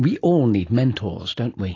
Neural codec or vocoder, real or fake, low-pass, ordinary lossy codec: none; real; 7.2 kHz; AAC, 32 kbps